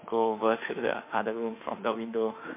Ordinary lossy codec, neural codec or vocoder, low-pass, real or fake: MP3, 24 kbps; autoencoder, 48 kHz, 32 numbers a frame, DAC-VAE, trained on Japanese speech; 3.6 kHz; fake